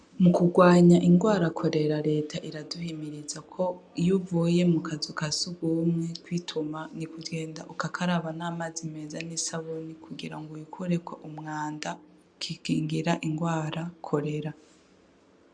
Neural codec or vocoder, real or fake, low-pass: none; real; 9.9 kHz